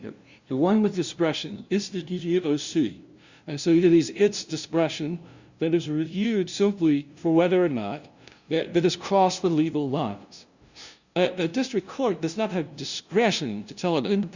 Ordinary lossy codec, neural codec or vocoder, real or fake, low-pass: Opus, 64 kbps; codec, 16 kHz, 0.5 kbps, FunCodec, trained on LibriTTS, 25 frames a second; fake; 7.2 kHz